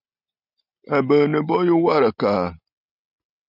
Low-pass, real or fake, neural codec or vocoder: 5.4 kHz; real; none